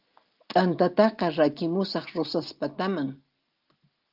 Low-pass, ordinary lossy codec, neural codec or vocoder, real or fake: 5.4 kHz; Opus, 32 kbps; none; real